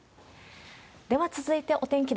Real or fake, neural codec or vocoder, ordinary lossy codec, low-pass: real; none; none; none